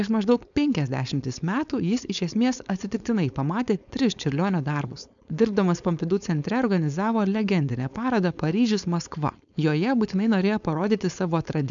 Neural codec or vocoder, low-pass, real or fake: codec, 16 kHz, 4.8 kbps, FACodec; 7.2 kHz; fake